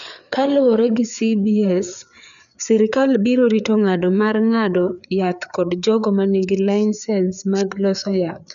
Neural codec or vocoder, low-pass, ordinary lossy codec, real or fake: codec, 16 kHz, 4 kbps, FreqCodec, larger model; 7.2 kHz; none; fake